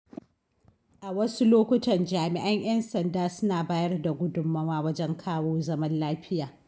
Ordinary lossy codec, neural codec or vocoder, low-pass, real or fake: none; none; none; real